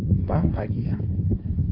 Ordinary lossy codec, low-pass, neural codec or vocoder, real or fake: AAC, 32 kbps; 5.4 kHz; codec, 16 kHz in and 24 kHz out, 1.1 kbps, FireRedTTS-2 codec; fake